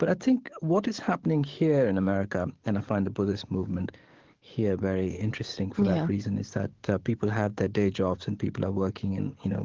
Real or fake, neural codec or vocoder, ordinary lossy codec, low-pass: fake; codec, 44.1 kHz, 7.8 kbps, DAC; Opus, 16 kbps; 7.2 kHz